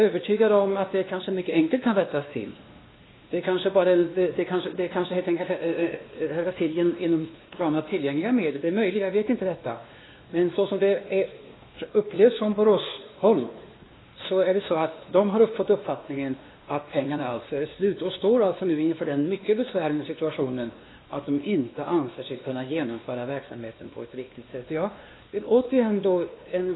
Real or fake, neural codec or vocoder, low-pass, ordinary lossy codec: fake; codec, 16 kHz, 2 kbps, X-Codec, WavLM features, trained on Multilingual LibriSpeech; 7.2 kHz; AAC, 16 kbps